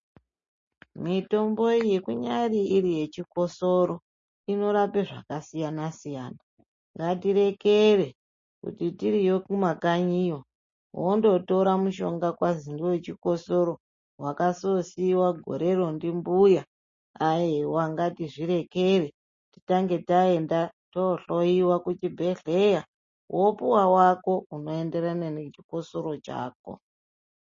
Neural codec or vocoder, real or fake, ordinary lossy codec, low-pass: none; real; MP3, 32 kbps; 7.2 kHz